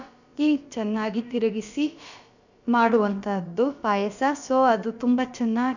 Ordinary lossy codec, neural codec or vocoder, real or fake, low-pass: none; codec, 16 kHz, about 1 kbps, DyCAST, with the encoder's durations; fake; 7.2 kHz